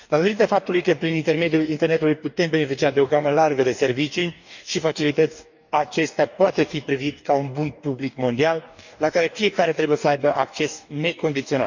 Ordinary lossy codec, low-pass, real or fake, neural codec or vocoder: none; 7.2 kHz; fake; codec, 44.1 kHz, 2.6 kbps, DAC